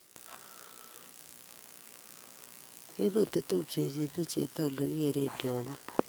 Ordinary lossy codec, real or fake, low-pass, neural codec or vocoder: none; fake; none; codec, 44.1 kHz, 2.6 kbps, SNAC